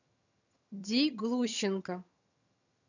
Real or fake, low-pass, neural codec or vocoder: fake; 7.2 kHz; vocoder, 22.05 kHz, 80 mel bands, HiFi-GAN